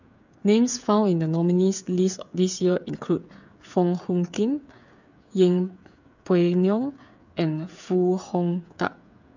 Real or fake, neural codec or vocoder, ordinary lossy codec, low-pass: fake; codec, 16 kHz, 8 kbps, FreqCodec, smaller model; none; 7.2 kHz